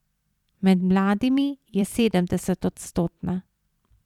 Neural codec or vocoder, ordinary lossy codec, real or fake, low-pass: vocoder, 44.1 kHz, 128 mel bands every 256 samples, BigVGAN v2; none; fake; 19.8 kHz